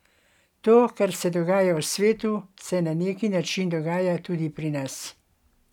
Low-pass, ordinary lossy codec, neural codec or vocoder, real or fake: 19.8 kHz; none; none; real